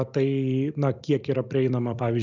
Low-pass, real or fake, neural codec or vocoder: 7.2 kHz; real; none